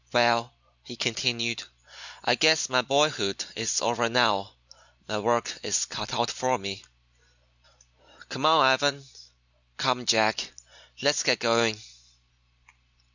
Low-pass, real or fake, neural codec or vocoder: 7.2 kHz; real; none